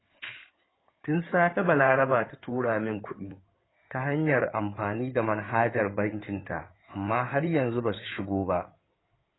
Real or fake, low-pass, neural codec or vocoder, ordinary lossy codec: fake; 7.2 kHz; codec, 16 kHz in and 24 kHz out, 2.2 kbps, FireRedTTS-2 codec; AAC, 16 kbps